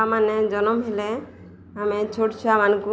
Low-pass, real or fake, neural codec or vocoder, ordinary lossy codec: none; real; none; none